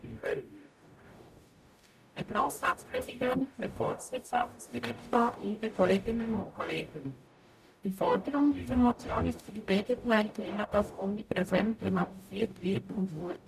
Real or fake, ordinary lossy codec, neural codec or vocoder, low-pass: fake; none; codec, 44.1 kHz, 0.9 kbps, DAC; 14.4 kHz